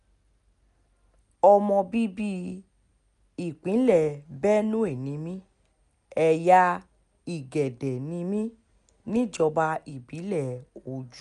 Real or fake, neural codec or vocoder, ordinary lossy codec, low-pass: real; none; none; 10.8 kHz